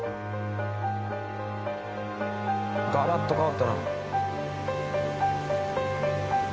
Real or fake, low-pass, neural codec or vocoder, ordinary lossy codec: real; none; none; none